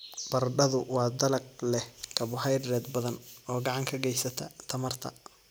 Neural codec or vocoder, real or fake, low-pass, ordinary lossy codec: none; real; none; none